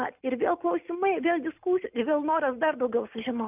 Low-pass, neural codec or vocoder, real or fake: 3.6 kHz; none; real